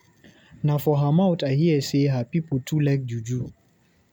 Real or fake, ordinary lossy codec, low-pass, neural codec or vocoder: real; none; 19.8 kHz; none